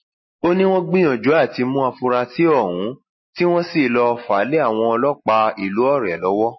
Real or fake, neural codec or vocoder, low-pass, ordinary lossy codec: real; none; 7.2 kHz; MP3, 24 kbps